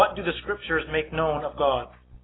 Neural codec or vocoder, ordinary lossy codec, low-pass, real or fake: none; AAC, 16 kbps; 7.2 kHz; real